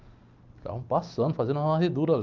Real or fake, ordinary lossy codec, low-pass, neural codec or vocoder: real; Opus, 24 kbps; 7.2 kHz; none